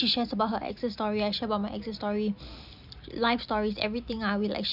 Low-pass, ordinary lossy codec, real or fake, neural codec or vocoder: 5.4 kHz; none; real; none